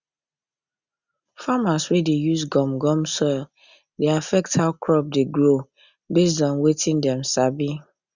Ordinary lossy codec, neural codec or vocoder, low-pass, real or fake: Opus, 64 kbps; none; 7.2 kHz; real